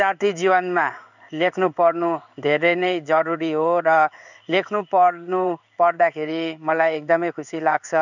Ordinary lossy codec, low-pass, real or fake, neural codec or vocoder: none; 7.2 kHz; fake; codec, 16 kHz in and 24 kHz out, 1 kbps, XY-Tokenizer